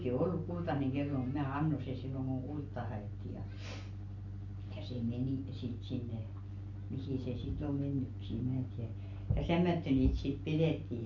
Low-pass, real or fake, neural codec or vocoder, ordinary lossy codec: 7.2 kHz; real; none; none